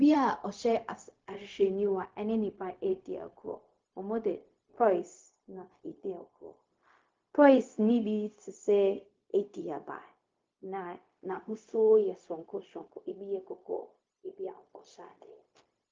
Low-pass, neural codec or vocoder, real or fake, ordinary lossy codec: 7.2 kHz; codec, 16 kHz, 0.4 kbps, LongCat-Audio-Codec; fake; Opus, 24 kbps